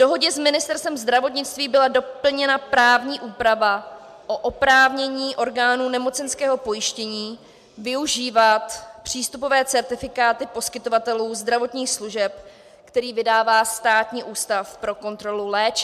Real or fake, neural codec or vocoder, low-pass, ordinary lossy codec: real; none; 14.4 kHz; MP3, 96 kbps